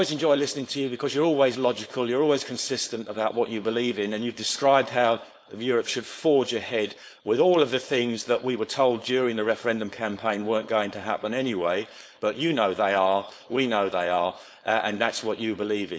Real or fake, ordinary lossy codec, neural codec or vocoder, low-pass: fake; none; codec, 16 kHz, 4.8 kbps, FACodec; none